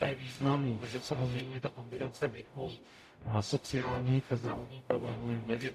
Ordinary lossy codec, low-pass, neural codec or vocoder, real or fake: AAC, 96 kbps; 14.4 kHz; codec, 44.1 kHz, 0.9 kbps, DAC; fake